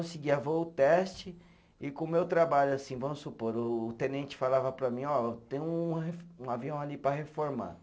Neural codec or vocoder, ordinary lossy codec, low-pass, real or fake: none; none; none; real